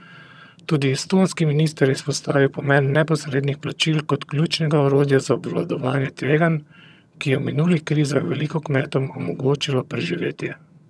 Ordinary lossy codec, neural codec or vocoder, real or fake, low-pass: none; vocoder, 22.05 kHz, 80 mel bands, HiFi-GAN; fake; none